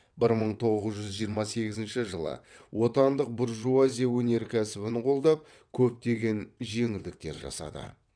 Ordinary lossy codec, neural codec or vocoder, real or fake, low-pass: none; vocoder, 22.05 kHz, 80 mel bands, WaveNeXt; fake; 9.9 kHz